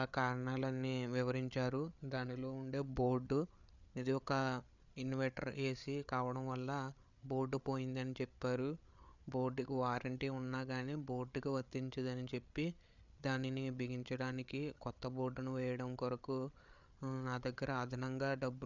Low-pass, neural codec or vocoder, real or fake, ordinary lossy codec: 7.2 kHz; codec, 16 kHz, 8 kbps, FreqCodec, larger model; fake; none